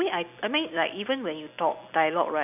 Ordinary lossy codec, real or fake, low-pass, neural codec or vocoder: none; real; 3.6 kHz; none